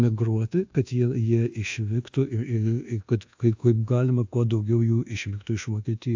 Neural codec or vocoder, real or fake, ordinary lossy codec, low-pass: codec, 24 kHz, 1.2 kbps, DualCodec; fake; AAC, 48 kbps; 7.2 kHz